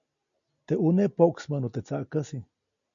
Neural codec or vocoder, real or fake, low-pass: none; real; 7.2 kHz